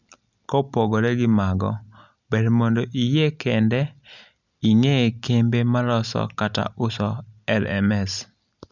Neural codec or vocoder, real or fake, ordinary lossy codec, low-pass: none; real; none; 7.2 kHz